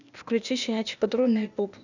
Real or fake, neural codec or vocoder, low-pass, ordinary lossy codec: fake; codec, 16 kHz, 0.8 kbps, ZipCodec; 7.2 kHz; none